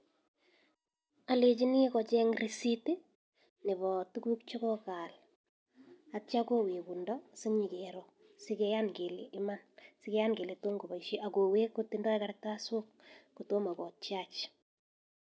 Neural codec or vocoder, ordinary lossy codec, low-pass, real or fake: none; none; none; real